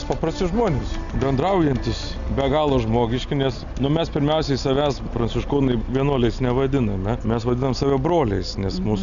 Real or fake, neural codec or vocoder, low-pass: real; none; 7.2 kHz